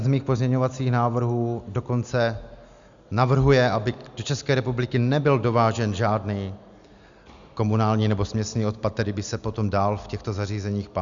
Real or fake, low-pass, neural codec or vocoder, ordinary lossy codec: real; 7.2 kHz; none; Opus, 64 kbps